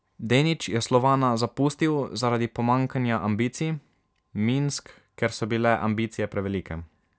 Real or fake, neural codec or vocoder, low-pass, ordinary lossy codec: real; none; none; none